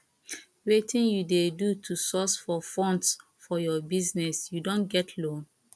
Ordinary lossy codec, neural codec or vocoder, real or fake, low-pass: none; none; real; none